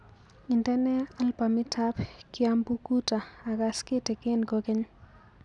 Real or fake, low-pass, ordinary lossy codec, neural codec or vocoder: real; 10.8 kHz; none; none